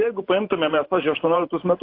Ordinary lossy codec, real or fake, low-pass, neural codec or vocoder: AAC, 32 kbps; fake; 5.4 kHz; vocoder, 44.1 kHz, 128 mel bands every 512 samples, BigVGAN v2